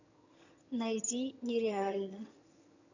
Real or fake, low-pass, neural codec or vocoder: fake; 7.2 kHz; vocoder, 22.05 kHz, 80 mel bands, HiFi-GAN